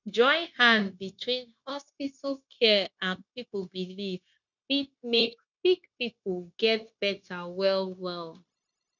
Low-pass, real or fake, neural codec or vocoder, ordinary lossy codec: 7.2 kHz; fake; codec, 16 kHz, 0.9 kbps, LongCat-Audio-Codec; none